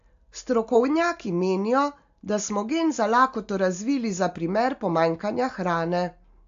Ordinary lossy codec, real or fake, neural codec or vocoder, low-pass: AAC, 64 kbps; real; none; 7.2 kHz